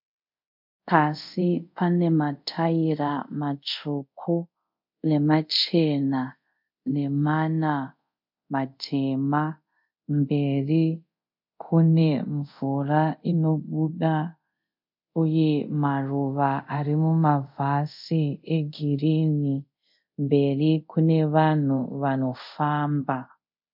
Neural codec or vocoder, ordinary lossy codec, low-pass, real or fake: codec, 24 kHz, 0.5 kbps, DualCodec; MP3, 48 kbps; 5.4 kHz; fake